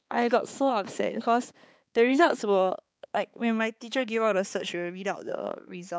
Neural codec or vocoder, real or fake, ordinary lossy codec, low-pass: codec, 16 kHz, 4 kbps, X-Codec, HuBERT features, trained on balanced general audio; fake; none; none